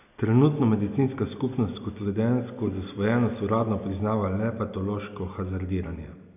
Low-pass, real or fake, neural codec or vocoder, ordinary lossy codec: 3.6 kHz; real; none; none